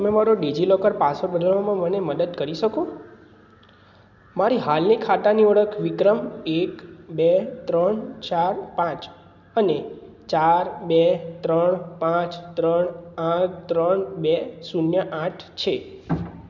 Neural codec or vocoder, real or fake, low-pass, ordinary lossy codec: none; real; 7.2 kHz; none